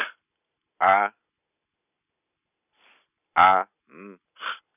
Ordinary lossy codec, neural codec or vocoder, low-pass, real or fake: none; none; 3.6 kHz; real